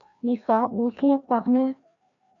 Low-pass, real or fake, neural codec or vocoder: 7.2 kHz; fake; codec, 16 kHz, 1 kbps, FreqCodec, larger model